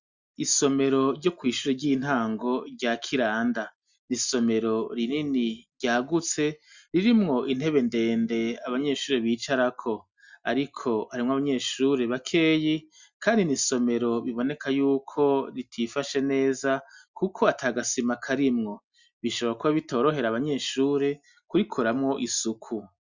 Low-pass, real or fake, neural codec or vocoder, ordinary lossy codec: 7.2 kHz; real; none; Opus, 64 kbps